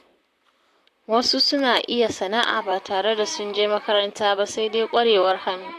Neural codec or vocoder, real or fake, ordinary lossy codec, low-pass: vocoder, 44.1 kHz, 128 mel bands, Pupu-Vocoder; fake; AAC, 64 kbps; 14.4 kHz